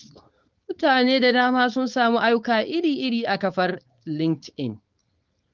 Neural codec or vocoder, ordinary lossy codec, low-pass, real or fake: codec, 16 kHz, 4.8 kbps, FACodec; Opus, 32 kbps; 7.2 kHz; fake